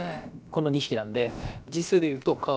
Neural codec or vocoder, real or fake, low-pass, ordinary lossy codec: codec, 16 kHz, about 1 kbps, DyCAST, with the encoder's durations; fake; none; none